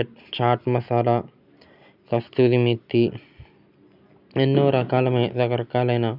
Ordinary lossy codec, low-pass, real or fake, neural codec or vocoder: none; 5.4 kHz; real; none